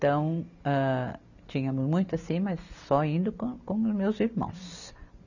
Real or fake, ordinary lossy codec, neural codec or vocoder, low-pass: real; none; none; 7.2 kHz